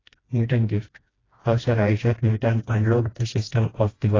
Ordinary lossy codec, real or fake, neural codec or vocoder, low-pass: AAC, 32 kbps; fake; codec, 16 kHz, 1 kbps, FreqCodec, smaller model; 7.2 kHz